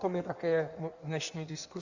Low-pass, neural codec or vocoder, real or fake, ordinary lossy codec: 7.2 kHz; codec, 16 kHz in and 24 kHz out, 1.1 kbps, FireRedTTS-2 codec; fake; Opus, 64 kbps